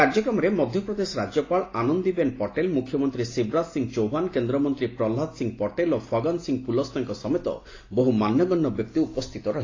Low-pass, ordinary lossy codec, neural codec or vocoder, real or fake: 7.2 kHz; AAC, 32 kbps; none; real